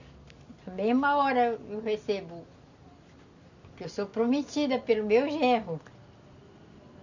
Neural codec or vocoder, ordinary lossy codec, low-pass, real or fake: none; none; 7.2 kHz; real